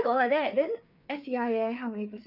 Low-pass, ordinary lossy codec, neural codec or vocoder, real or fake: 5.4 kHz; none; codec, 16 kHz, 4 kbps, FreqCodec, larger model; fake